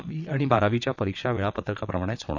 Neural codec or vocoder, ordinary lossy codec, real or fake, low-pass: vocoder, 22.05 kHz, 80 mel bands, WaveNeXt; none; fake; 7.2 kHz